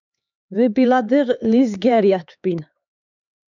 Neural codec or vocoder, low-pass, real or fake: codec, 16 kHz, 4 kbps, X-Codec, HuBERT features, trained on LibriSpeech; 7.2 kHz; fake